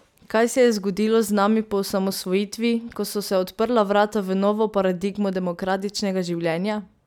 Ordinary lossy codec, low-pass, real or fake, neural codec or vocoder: none; 19.8 kHz; real; none